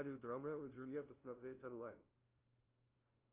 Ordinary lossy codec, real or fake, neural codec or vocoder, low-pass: Opus, 32 kbps; fake; codec, 16 kHz, 0.5 kbps, FunCodec, trained on LibriTTS, 25 frames a second; 3.6 kHz